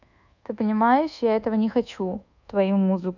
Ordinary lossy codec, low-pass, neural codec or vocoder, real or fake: none; 7.2 kHz; codec, 24 kHz, 1.2 kbps, DualCodec; fake